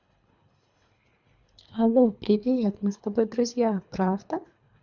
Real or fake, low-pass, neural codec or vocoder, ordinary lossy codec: fake; 7.2 kHz; codec, 24 kHz, 3 kbps, HILCodec; none